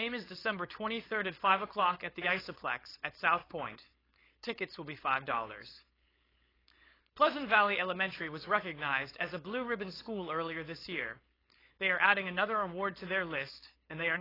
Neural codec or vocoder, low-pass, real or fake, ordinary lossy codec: codec, 16 kHz, 4.8 kbps, FACodec; 5.4 kHz; fake; AAC, 24 kbps